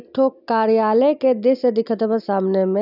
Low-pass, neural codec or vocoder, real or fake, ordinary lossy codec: 5.4 kHz; none; real; none